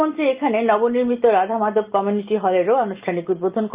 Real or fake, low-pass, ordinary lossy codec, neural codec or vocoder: real; 3.6 kHz; Opus, 24 kbps; none